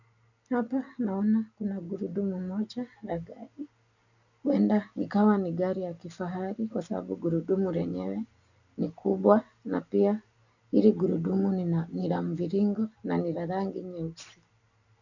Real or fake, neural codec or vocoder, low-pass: real; none; 7.2 kHz